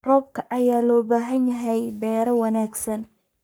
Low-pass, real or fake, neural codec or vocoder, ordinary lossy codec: none; fake; codec, 44.1 kHz, 3.4 kbps, Pupu-Codec; none